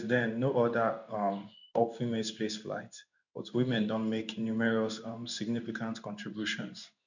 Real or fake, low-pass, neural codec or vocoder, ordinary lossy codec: fake; 7.2 kHz; codec, 16 kHz in and 24 kHz out, 1 kbps, XY-Tokenizer; none